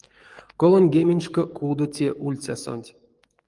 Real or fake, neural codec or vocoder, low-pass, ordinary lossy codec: fake; codec, 44.1 kHz, 7.8 kbps, DAC; 10.8 kHz; Opus, 32 kbps